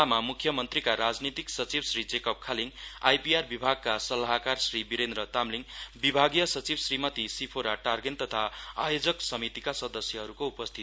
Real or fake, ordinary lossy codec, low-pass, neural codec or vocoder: real; none; none; none